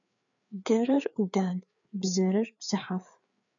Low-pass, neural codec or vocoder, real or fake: 7.2 kHz; codec, 16 kHz, 4 kbps, FreqCodec, larger model; fake